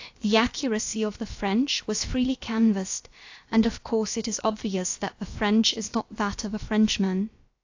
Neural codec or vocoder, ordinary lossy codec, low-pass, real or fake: codec, 16 kHz, about 1 kbps, DyCAST, with the encoder's durations; AAC, 48 kbps; 7.2 kHz; fake